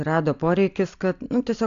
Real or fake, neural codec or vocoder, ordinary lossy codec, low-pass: real; none; AAC, 64 kbps; 7.2 kHz